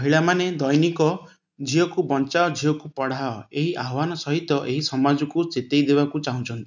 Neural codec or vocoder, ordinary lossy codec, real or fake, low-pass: none; none; real; 7.2 kHz